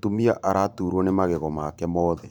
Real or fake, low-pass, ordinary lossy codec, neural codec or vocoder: fake; 19.8 kHz; none; vocoder, 44.1 kHz, 128 mel bands every 512 samples, BigVGAN v2